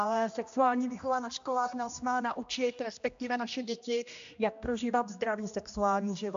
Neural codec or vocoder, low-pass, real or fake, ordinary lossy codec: codec, 16 kHz, 1 kbps, X-Codec, HuBERT features, trained on general audio; 7.2 kHz; fake; AAC, 64 kbps